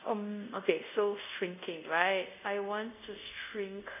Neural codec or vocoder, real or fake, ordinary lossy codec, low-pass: codec, 24 kHz, 0.5 kbps, DualCodec; fake; none; 3.6 kHz